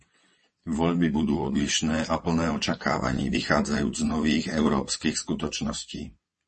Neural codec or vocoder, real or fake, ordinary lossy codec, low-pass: vocoder, 22.05 kHz, 80 mel bands, WaveNeXt; fake; MP3, 32 kbps; 9.9 kHz